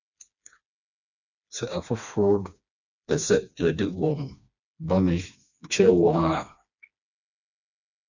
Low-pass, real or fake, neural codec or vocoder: 7.2 kHz; fake; codec, 16 kHz, 2 kbps, FreqCodec, smaller model